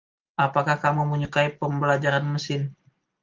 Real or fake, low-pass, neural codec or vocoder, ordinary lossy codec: real; 7.2 kHz; none; Opus, 32 kbps